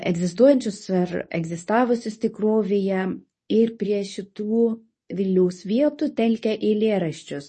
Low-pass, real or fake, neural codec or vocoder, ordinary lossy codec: 10.8 kHz; fake; codec, 24 kHz, 0.9 kbps, WavTokenizer, medium speech release version 2; MP3, 32 kbps